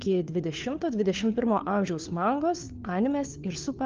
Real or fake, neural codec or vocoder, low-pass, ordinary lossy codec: fake; codec, 16 kHz, 4 kbps, FunCodec, trained on LibriTTS, 50 frames a second; 7.2 kHz; Opus, 32 kbps